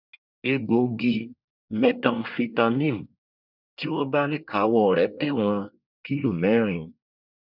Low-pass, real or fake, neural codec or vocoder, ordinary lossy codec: 5.4 kHz; fake; codec, 24 kHz, 1 kbps, SNAC; none